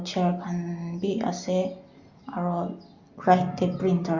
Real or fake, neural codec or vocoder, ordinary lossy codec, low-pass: real; none; Opus, 64 kbps; 7.2 kHz